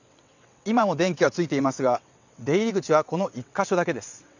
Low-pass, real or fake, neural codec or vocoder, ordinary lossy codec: 7.2 kHz; fake; vocoder, 22.05 kHz, 80 mel bands, WaveNeXt; none